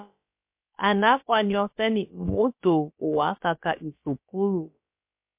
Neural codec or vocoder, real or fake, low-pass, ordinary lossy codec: codec, 16 kHz, about 1 kbps, DyCAST, with the encoder's durations; fake; 3.6 kHz; MP3, 32 kbps